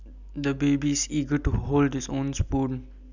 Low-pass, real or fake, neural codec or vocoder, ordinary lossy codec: 7.2 kHz; real; none; none